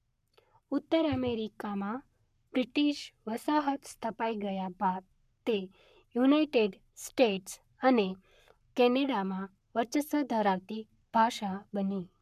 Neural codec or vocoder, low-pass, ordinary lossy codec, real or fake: codec, 44.1 kHz, 7.8 kbps, Pupu-Codec; 14.4 kHz; none; fake